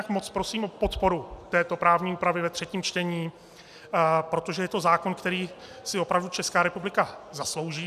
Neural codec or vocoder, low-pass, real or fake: none; 14.4 kHz; real